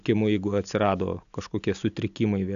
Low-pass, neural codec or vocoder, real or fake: 7.2 kHz; none; real